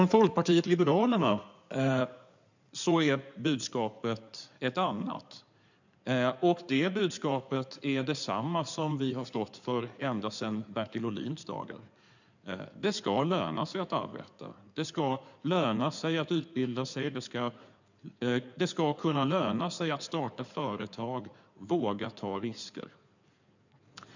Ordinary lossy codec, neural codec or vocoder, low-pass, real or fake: none; codec, 16 kHz in and 24 kHz out, 2.2 kbps, FireRedTTS-2 codec; 7.2 kHz; fake